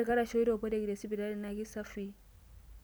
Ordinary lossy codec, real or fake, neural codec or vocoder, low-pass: none; real; none; none